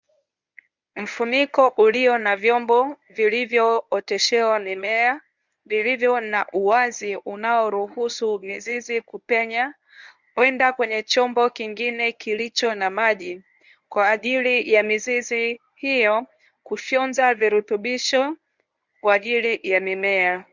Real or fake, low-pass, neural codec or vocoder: fake; 7.2 kHz; codec, 24 kHz, 0.9 kbps, WavTokenizer, medium speech release version 1